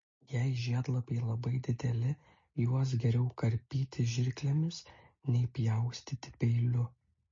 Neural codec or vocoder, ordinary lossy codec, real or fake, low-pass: none; MP3, 32 kbps; real; 7.2 kHz